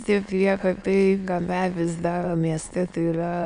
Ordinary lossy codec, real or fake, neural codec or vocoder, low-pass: MP3, 96 kbps; fake; autoencoder, 22.05 kHz, a latent of 192 numbers a frame, VITS, trained on many speakers; 9.9 kHz